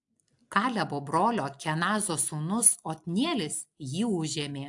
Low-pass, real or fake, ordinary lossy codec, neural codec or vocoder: 10.8 kHz; real; AAC, 64 kbps; none